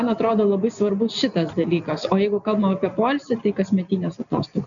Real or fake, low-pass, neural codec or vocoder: real; 7.2 kHz; none